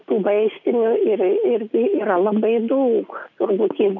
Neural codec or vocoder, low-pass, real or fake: none; 7.2 kHz; real